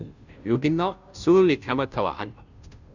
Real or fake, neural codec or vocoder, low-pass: fake; codec, 16 kHz, 0.5 kbps, FunCodec, trained on Chinese and English, 25 frames a second; 7.2 kHz